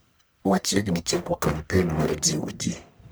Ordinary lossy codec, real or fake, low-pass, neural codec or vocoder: none; fake; none; codec, 44.1 kHz, 1.7 kbps, Pupu-Codec